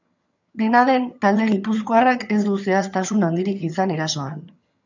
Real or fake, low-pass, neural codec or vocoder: fake; 7.2 kHz; vocoder, 22.05 kHz, 80 mel bands, HiFi-GAN